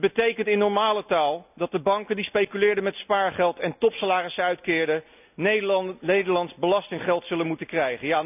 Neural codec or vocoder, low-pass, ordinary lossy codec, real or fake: none; 3.6 kHz; none; real